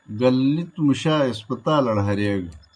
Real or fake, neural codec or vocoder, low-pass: real; none; 9.9 kHz